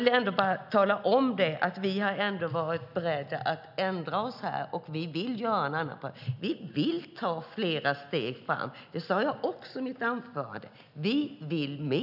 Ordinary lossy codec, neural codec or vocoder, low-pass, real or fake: none; none; 5.4 kHz; real